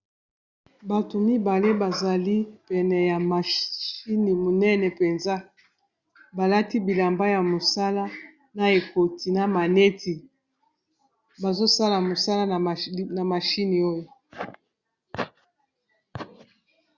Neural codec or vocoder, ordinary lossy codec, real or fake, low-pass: none; Opus, 64 kbps; real; 7.2 kHz